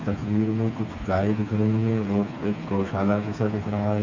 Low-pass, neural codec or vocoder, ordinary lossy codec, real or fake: 7.2 kHz; codec, 16 kHz, 4 kbps, FreqCodec, smaller model; MP3, 64 kbps; fake